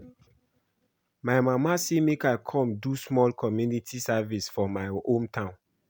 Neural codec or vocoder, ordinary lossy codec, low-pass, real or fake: none; none; none; real